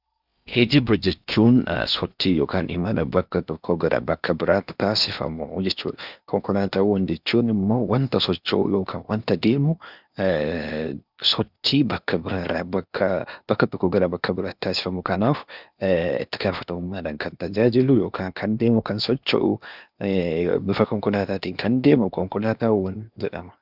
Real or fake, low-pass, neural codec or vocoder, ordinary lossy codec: fake; 5.4 kHz; codec, 16 kHz in and 24 kHz out, 0.8 kbps, FocalCodec, streaming, 65536 codes; Opus, 64 kbps